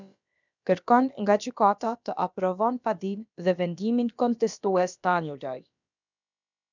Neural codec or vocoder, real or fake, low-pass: codec, 16 kHz, about 1 kbps, DyCAST, with the encoder's durations; fake; 7.2 kHz